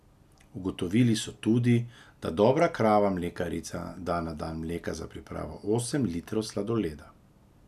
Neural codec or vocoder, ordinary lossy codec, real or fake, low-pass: none; none; real; 14.4 kHz